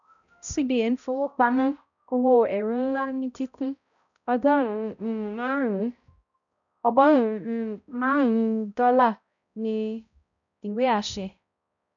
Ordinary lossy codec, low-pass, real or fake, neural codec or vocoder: none; 7.2 kHz; fake; codec, 16 kHz, 0.5 kbps, X-Codec, HuBERT features, trained on balanced general audio